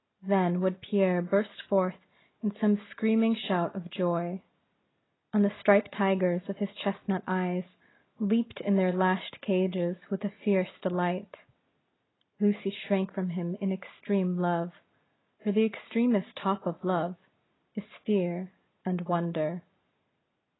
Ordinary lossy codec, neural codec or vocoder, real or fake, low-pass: AAC, 16 kbps; none; real; 7.2 kHz